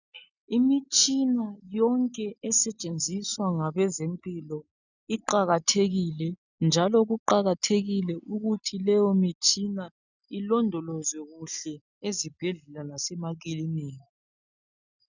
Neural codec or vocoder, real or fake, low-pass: none; real; 7.2 kHz